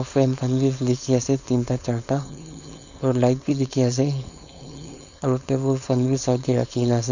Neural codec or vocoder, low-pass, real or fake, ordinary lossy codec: codec, 16 kHz, 4.8 kbps, FACodec; 7.2 kHz; fake; none